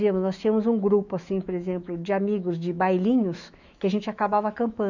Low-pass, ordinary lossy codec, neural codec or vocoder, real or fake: 7.2 kHz; none; autoencoder, 48 kHz, 128 numbers a frame, DAC-VAE, trained on Japanese speech; fake